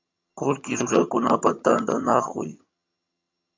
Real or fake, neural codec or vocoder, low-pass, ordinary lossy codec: fake; vocoder, 22.05 kHz, 80 mel bands, HiFi-GAN; 7.2 kHz; MP3, 64 kbps